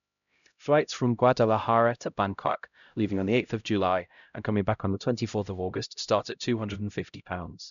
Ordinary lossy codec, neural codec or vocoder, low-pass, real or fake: none; codec, 16 kHz, 0.5 kbps, X-Codec, HuBERT features, trained on LibriSpeech; 7.2 kHz; fake